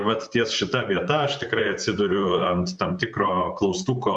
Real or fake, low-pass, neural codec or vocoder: fake; 10.8 kHz; vocoder, 44.1 kHz, 128 mel bands, Pupu-Vocoder